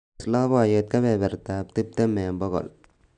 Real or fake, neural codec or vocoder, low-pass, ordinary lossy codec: real; none; 9.9 kHz; none